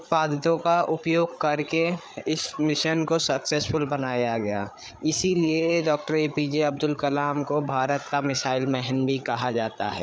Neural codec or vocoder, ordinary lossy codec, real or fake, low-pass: codec, 16 kHz, 8 kbps, FreqCodec, larger model; none; fake; none